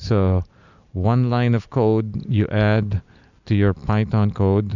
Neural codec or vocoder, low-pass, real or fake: none; 7.2 kHz; real